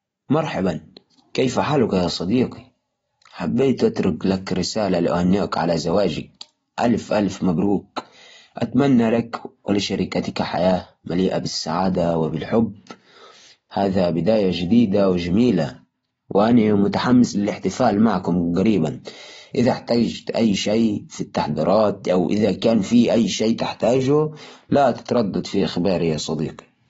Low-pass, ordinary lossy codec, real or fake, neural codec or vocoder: 19.8 kHz; AAC, 24 kbps; real; none